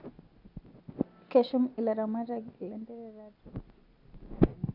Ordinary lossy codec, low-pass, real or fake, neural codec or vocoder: AAC, 32 kbps; 5.4 kHz; real; none